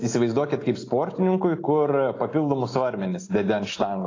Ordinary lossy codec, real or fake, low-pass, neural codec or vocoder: AAC, 32 kbps; real; 7.2 kHz; none